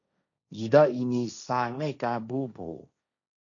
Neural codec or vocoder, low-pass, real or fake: codec, 16 kHz, 1.1 kbps, Voila-Tokenizer; 7.2 kHz; fake